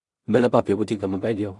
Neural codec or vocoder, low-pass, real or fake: codec, 16 kHz in and 24 kHz out, 0.4 kbps, LongCat-Audio-Codec, two codebook decoder; 10.8 kHz; fake